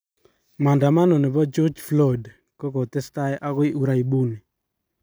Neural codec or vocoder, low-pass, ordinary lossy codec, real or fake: none; none; none; real